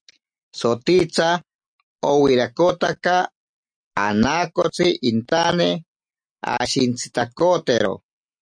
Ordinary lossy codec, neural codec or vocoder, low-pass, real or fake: MP3, 96 kbps; none; 9.9 kHz; real